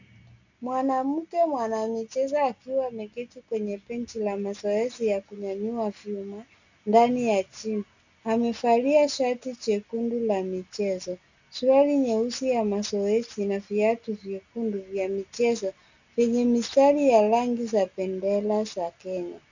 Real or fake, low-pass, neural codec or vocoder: real; 7.2 kHz; none